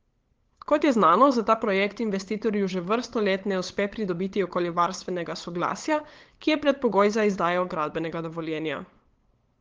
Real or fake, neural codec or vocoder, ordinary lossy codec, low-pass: fake; codec, 16 kHz, 8 kbps, FunCodec, trained on LibriTTS, 25 frames a second; Opus, 16 kbps; 7.2 kHz